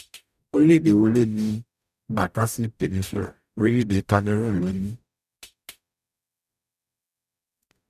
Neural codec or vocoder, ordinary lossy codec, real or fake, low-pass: codec, 44.1 kHz, 0.9 kbps, DAC; none; fake; 14.4 kHz